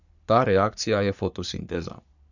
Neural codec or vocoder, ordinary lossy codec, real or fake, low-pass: codec, 44.1 kHz, 3.4 kbps, Pupu-Codec; none; fake; 7.2 kHz